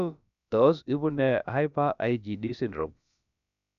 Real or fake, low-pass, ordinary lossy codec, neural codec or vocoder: fake; 7.2 kHz; none; codec, 16 kHz, about 1 kbps, DyCAST, with the encoder's durations